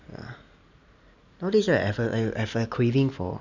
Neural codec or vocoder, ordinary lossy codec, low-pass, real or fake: none; none; 7.2 kHz; real